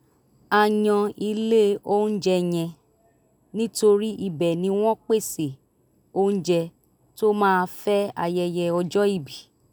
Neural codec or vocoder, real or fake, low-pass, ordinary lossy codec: none; real; none; none